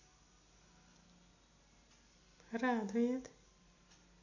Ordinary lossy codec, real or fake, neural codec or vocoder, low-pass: none; real; none; 7.2 kHz